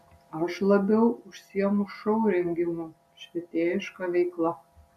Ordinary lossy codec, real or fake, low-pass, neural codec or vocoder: MP3, 96 kbps; real; 14.4 kHz; none